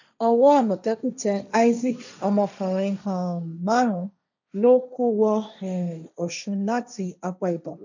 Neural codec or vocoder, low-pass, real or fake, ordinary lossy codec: codec, 16 kHz, 1.1 kbps, Voila-Tokenizer; 7.2 kHz; fake; none